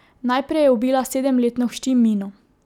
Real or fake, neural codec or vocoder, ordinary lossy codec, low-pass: real; none; none; 19.8 kHz